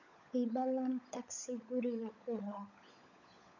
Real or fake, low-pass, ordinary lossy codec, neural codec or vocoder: fake; 7.2 kHz; none; codec, 16 kHz, 16 kbps, FunCodec, trained on LibriTTS, 50 frames a second